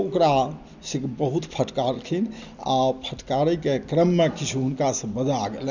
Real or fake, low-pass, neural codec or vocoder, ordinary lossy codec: real; 7.2 kHz; none; none